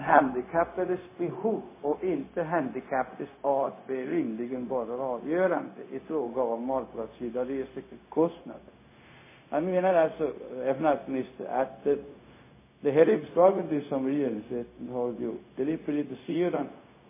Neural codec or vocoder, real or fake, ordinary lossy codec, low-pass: codec, 16 kHz, 0.4 kbps, LongCat-Audio-Codec; fake; MP3, 16 kbps; 3.6 kHz